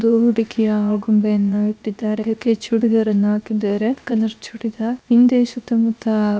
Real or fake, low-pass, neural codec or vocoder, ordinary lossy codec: fake; none; codec, 16 kHz, 0.7 kbps, FocalCodec; none